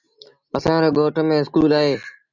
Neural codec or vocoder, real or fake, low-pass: none; real; 7.2 kHz